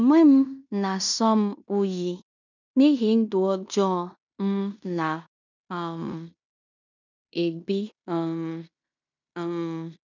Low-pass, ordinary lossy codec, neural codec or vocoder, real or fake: 7.2 kHz; none; codec, 16 kHz in and 24 kHz out, 0.9 kbps, LongCat-Audio-Codec, fine tuned four codebook decoder; fake